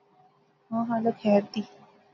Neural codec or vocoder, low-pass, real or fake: none; 7.2 kHz; real